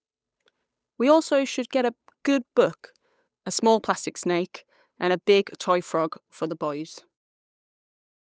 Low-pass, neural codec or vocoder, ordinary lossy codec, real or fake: none; codec, 16 kHz, 8 kbps, FunCodec, trained on Chinese and English, 25 frames a second; none; fake